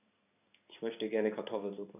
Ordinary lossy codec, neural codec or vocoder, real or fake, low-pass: none; codec, 16 kHz in and 24 kHz out, 1 kbps, XY-Tokenizer; fake; 3.6 kHz